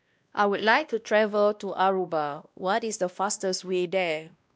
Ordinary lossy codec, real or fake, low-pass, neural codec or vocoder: none; fake; none; codec, 16 kHz, 1 kbps, X-Codec, WavLM features, trained on Multilingual LibriSpeech